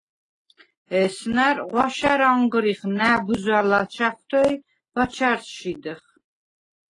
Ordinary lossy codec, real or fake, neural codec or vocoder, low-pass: AAC, 32 kbps; real; none; 10.8 kHz